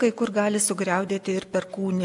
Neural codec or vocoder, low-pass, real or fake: none; 10.8 kHz; real